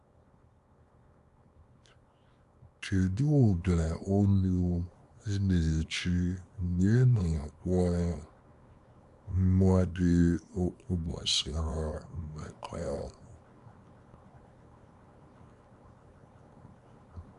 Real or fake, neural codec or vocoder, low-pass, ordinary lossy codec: fake; codec, 24 kHz, 0.9 kbps, WavTokenizer, small release; 10.8 kHz; AAC, 96 kbps